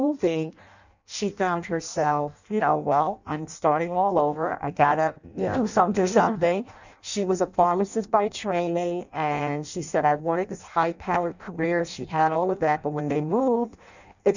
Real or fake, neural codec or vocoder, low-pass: fake; codec, 16 kHz in and 24 kHz out, 0.6 kbps, FireRedTTS-2 codec; 7.2 kHz